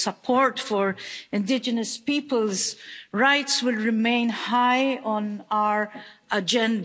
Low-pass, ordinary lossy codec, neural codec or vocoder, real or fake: none; none; none; real